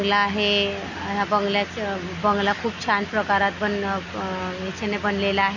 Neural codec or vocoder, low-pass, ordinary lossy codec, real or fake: none; 7.2 kHz; none; real